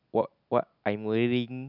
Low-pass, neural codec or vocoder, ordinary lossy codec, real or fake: 5.4 kHz; none; none; real